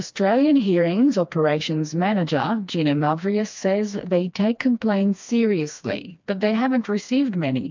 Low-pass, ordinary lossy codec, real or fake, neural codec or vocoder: 7.2 kHz; MP3, 64 kbps; fake; codec, 16 kHz, 2 kbps, FreqCodec, smaller model